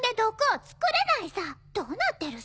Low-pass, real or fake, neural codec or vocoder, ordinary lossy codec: none; real; none; none